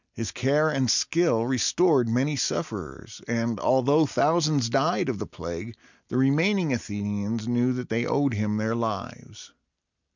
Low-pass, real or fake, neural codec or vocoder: 7.2 kHz; real; none